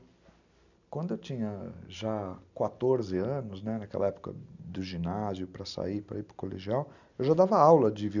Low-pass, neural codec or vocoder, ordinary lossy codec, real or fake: 7.2 kHz; none; none; real